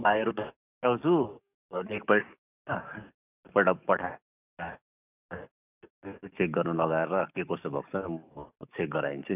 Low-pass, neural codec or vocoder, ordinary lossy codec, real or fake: 3.6 kHz; codec, 44.1 kHz, 7.8 kbps, DAC; none; fake